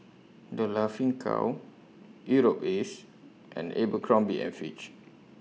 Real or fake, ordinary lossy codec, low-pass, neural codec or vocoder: real; none; none; none